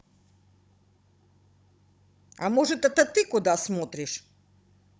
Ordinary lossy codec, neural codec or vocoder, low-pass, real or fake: none; codec, 16 kHz, 16 kbps, FunCodec, trained on Chinese and English, 50 frames a second; none; fake